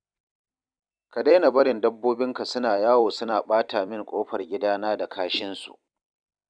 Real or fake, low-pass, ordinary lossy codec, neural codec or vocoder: fake; 9.9 kHz; none; vocoder, 44.1 kHz, 128 mel bands every 256 samples, BigVGAN v2